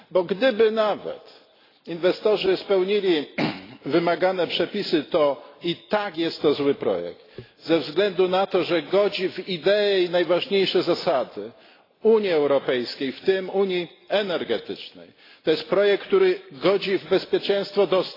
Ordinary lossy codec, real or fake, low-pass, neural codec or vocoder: AAC, 24 kbps; real; 5.4 kHz; none